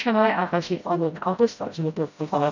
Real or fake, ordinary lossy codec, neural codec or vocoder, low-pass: fake; none; codec, 16 kHz, 0.5 kbps, FreqCodec, smaller model; 7.2 kHz